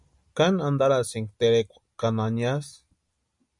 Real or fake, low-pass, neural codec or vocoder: real; 10.8 kHz; none